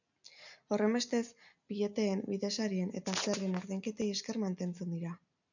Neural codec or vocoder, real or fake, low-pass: none; real; 7.2 kHz